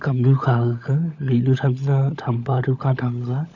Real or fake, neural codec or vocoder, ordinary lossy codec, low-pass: fake; codec, 16 kHz, 16 kbps, FunCodec, trained on LibriTTS, 50 frames a second; none; 7.2 kHz